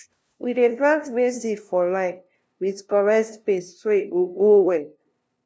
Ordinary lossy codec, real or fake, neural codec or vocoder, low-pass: none; fake; codec, 16 kHz, 0.5 kbps, FunCodec, trained on LibriTTS, 25 frames a second; none